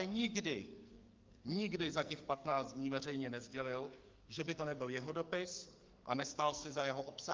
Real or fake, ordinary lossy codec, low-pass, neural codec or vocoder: fake; Opus, 32 kbps; 7.2 kHz; codec, 44.1 kHz, 2.6 kbps, SNAC